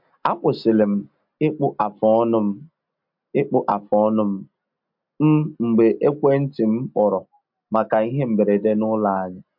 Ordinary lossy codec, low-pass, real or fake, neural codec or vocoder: MP3, 48 kbps; 5.4 kHz; real; none